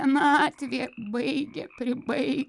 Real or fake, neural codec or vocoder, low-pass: real; none; 10.8 kHz